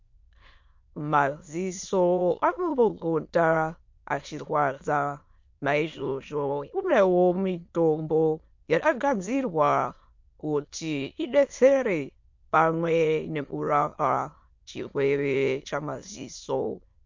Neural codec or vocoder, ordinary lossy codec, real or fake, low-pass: autoencoder, 22.05 kHz, a latent of 192 numbers a frame, VITS, trained on many speakers; MP3, 48 kbps; fake; 7.2 kHz